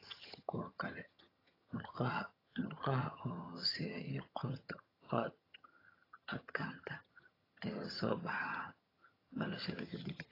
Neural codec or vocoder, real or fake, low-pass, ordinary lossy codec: vocoder, 22.05 kHz, 80 mel bands, HiFi-GAN; fake; 5.4 kHz; AAC, 24 kbps